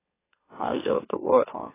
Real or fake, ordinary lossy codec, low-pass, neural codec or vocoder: fake; AAC, 16 kbps; 3.6 kHz; autoencoder, 44.1 kHz, a latent of 192 numbers a frame, MeloTTS